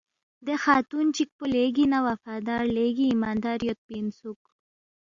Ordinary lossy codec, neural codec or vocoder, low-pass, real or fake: Opus, 64 kbps; none; 7.2 kHz; real